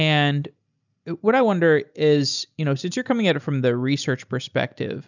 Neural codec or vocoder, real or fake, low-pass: none; real; 7.2 kHz